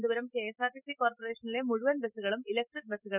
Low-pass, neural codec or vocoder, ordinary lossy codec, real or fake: 3.6 kHz; none; none; real